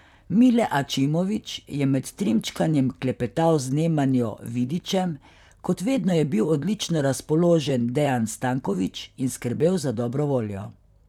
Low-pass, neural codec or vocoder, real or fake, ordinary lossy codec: 19.8 kHz; vocoder, 44.1 kHz, 128 mel bands, Pupu-Vocoder; fake; none